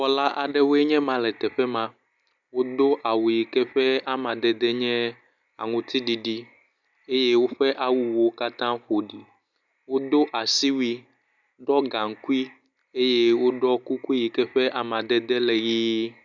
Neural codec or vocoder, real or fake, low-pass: none; real; 7.2 kHz